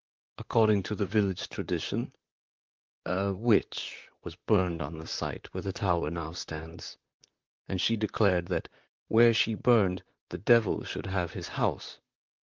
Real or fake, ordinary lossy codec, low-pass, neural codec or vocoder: fake; Opus, 32 kbps; 7.2 kHz; vocoder, 44.1 kHz, 128 mel bands, Pupu-Vocoder